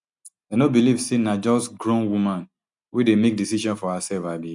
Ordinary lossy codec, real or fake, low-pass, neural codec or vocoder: none; real; 10.8 kHz; none